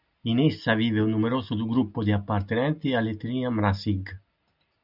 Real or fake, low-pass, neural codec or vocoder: real; 5.4 kHz; none